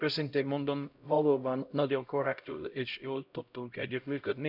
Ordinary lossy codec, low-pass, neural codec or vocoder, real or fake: none; 5.4 kHz; codec, 16 kHz, 0.5 kbps, X-Codec, HuBERT features, trained on LibriSpeech; fake